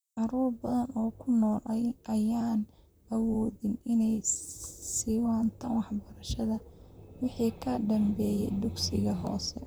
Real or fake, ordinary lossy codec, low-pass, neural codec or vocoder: fake; none; none; vocoder, 44.1 kHz, 128 mel bands, Pupu-Vocoder